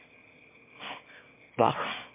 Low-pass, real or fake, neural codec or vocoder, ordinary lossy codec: 3.6 kHz; fake; autoencoder, 22.05 kHz, a latent of 192 numbers a frame, VITS, trained on one speaker; MP3, 24 kbps